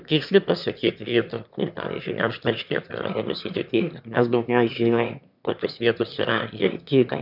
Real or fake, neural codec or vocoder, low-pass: fake; autoencoder, 22.05 kHz, a latent of 192 numbers a frame, VITS, trained on one speaker; 5.4 kHz